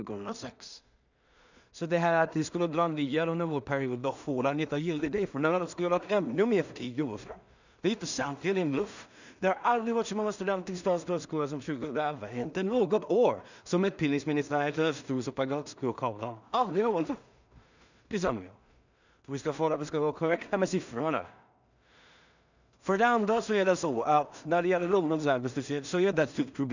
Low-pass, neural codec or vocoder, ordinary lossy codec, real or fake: 7.2 kHz; codec, 16 kHz in and 24 kHz out, 0.4 kbps, LongCat-Audio-Codec, two codebook decoder; none; fake